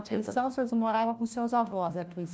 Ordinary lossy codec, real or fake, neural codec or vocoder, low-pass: none; fake; codec, 16 kHz, 1 kbps, FunCodec, trained on LibriTTS, 50 frames a second; none